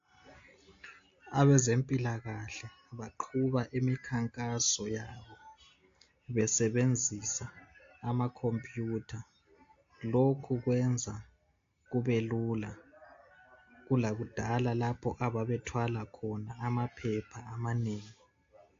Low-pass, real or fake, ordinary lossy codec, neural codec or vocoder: 7.2 kHz; real; AAC, 48 kbps; none